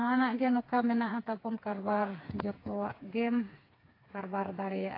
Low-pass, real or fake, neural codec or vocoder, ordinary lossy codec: 5.4 kHz; fake; codec, 16 kHz, 4 kbps, FreqCodec, smaller model; AAC, 24 kbps